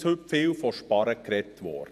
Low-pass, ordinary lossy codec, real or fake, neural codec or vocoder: 14.4 kHz; none; fake; vocoder, 48 kHz, 128 mel bands, Vocos